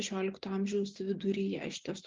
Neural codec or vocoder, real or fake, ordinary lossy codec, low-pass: none; real; Opus, 32 kbps; 7.2 kHz